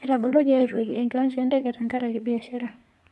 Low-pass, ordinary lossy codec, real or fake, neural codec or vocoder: none; none; fake; codec, 24 kHz, 1 kbps, SNAC